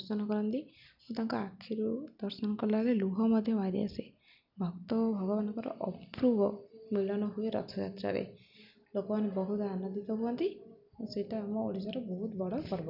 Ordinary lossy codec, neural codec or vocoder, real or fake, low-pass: none; none; real; 5.4 kHz